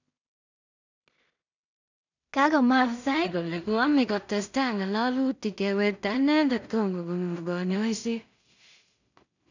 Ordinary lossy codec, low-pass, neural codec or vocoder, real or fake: none; 7.2 kHz; codec, 16 kHz in and 24 kHz out, 0.4 kbps, LongCat-Audio-Codec, two codebook decoder; fake